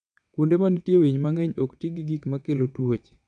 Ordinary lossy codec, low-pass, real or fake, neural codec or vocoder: none; 9.9 kHz; fake; vocoder, 22.05 kHz, 80 mel bands, WaveNeXt